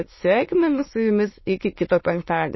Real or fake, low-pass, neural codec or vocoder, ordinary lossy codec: fake; 7.2 kHz; autoencoder, 22.05 kHz, a latent of 192 numbers a frame, VITS, trained on many speakers; MP3, 24 kbps